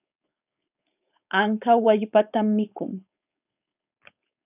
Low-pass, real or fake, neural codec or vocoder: 3.6 kHz; fake; codec, 16 kHz, 4.8 kbps, FACodec